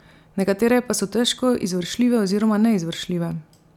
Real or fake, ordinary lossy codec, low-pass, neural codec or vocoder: real; none; 19.8 kHz; none